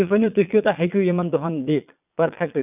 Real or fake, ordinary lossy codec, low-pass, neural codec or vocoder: fake; none; 3.6 kHz; vocoder, 22.05 kHz, 80 mel bands, Vocos